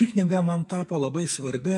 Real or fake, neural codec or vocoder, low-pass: fake; codec, 44.1 kHz, 2.6 kbps, SNAC; 10.8 kHz